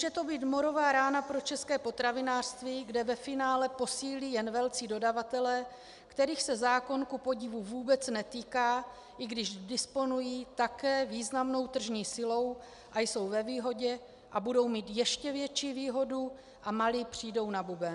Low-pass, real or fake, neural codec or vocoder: 10.8 kHz; real; none